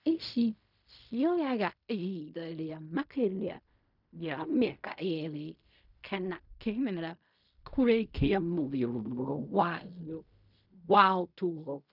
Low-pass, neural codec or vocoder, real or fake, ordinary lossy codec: 5.4 kHz; codec, 16 kHz in and 24 kHz out, 0.4 kbps, LongCat-Audio-Codec, fine tuned four codebook decoder; fake; none